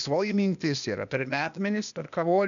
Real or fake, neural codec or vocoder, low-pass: fake; codec, 16 kHz, 0.8 kbps, ZipCodec; 7.2 kHz